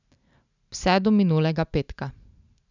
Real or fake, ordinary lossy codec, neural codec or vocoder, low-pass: real; none; none; 7.2 kHz